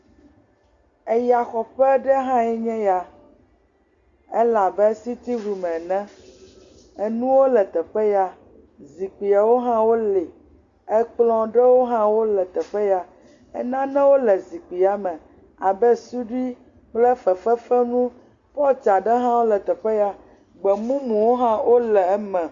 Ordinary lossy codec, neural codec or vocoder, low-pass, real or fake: Opus, 64 kbps; none; 7.2 kHz; real